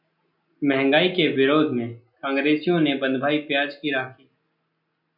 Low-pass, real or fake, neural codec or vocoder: 5.4 kHz; real; none